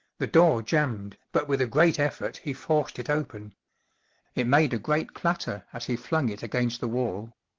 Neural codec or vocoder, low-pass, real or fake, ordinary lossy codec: none; 7.2 kHz; real; Opus, 16 kbps